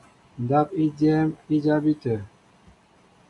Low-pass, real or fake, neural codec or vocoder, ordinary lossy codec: 10.8 kHz; real; none; AAC, 64 kbps